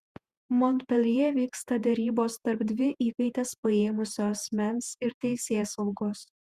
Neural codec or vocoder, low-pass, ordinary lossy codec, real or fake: vocoder, 48 kHz, 128 mel bands, Vocos; 14.4 kHz; Opus, 64 kbps; fake